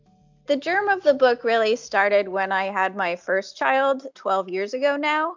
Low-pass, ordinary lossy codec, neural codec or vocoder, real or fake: 7.2 kHz; MP3, 64 kbps; none; real